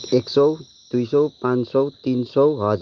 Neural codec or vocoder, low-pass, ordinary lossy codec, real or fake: none; 7.2 kHz; Opus, 32 kbps; real